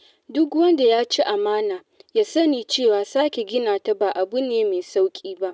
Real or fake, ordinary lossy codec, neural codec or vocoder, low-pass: real; none; none; none